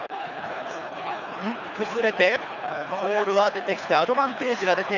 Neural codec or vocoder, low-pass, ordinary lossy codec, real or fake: codec, 24 kHz, 3 kbps, HILCodec; 7.2 kHz; none; fake